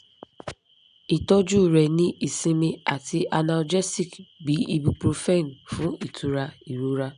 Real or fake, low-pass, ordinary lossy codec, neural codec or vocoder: real; 9.9 kHz; none; none